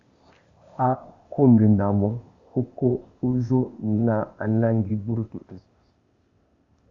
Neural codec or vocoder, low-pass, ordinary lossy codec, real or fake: codec, 16 kHz, 0.8 kbps, ZipCodec; 7.2 kHz; Opus, 64 kbps; fake